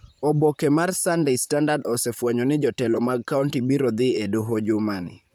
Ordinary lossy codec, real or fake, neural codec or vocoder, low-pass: none; fake; vocoder, 44.1 kHz, 128 mel bands, Pupu-Vocoder; none